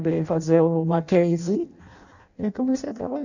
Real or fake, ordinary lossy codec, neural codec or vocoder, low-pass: fake; none; codec, 16 kHz in and 24 kHz out, 0.6 kbps, FireRedTTS-2 codec; 7.2 kHz